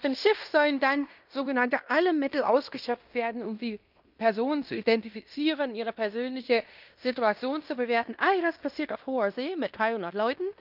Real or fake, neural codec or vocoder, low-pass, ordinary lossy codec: fake; codec, 16 kHz in and 24 kHz out, 0.9 kbps, LongCat-Audio-Codec, fine tuned four codebook decoder; 5.4 kHz; none